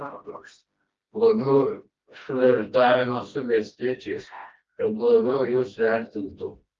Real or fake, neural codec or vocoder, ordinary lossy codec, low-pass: fake; codec, 16 kHz, 1 kbps, FreqCodec, smaller model; Opus, 32 kbps; 7.2 kHz